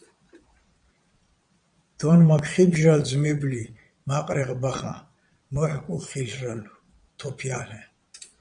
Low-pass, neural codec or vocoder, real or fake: 9.9 kHz; vocoder, 22.05 kHz, 80 mel bands, Vocos; fake